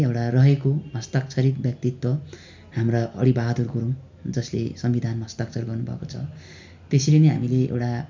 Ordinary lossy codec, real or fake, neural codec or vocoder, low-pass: MP3, 64 kbps; real; none; 7.2 kHz